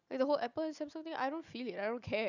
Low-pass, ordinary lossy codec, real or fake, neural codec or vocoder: 7.2 kHz; none; real; none